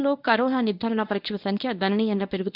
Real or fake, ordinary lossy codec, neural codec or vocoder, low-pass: fake; none; codec, 24 kHz, 0.9 kbps, WavTokenizer, medium speech release version 1; 5.4 kHz